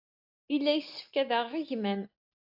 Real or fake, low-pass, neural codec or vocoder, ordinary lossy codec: real; 5.4 kHz; none; AAC, 48 kbps